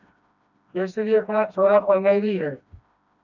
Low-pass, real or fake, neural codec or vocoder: 7.2 kHz; fake; codec, 16 kHz, 1 kbps, FreqCodec, smaller model